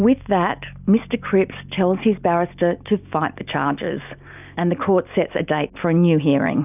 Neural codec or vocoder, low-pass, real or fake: none; 3.6 kHz; real